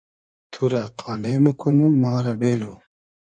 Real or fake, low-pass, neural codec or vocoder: fake; 9.9 kHz; codec, 16 kHz in and 24 kHz out, 1.1 kbps, FireRedTTS-2 codec